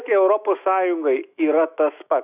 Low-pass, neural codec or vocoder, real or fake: 3.6 kHz; autoencoder, 48 kHz, 128 numbers a frame, DAC-VAE, trained on Japanese speech; fake